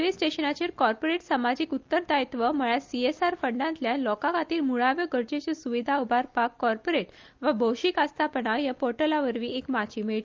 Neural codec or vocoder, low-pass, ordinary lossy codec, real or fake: none; 7.2 kHz; Opus, 24 kbps; real